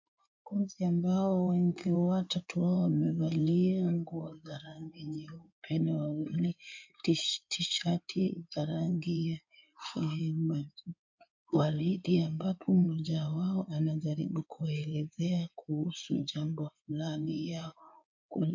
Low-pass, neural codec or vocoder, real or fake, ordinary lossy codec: 7.2 kHz; codec, 16 kHz in and 24 kHz out, 2.2 kbps, FireRedTTS-2 codec; fake; MP3, 64 kbps